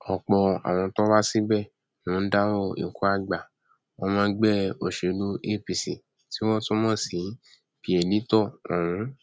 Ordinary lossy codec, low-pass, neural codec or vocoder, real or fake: none; none; none; real